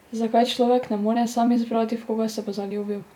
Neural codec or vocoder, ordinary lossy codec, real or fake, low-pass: vocoder, 44.1 kHz, 128 mel bands every 256 samples, BigVGAN v2; none; fake; 19.8 kHz